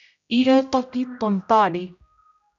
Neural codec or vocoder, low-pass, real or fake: codec, 16 kHz, 0.5 kbps, X-Codec, HuBERT features, trained on general audio; 7.2 kHz; fake